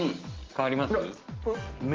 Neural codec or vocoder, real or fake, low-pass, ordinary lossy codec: none; real; 7.2 kHz; Opus, 16 kbps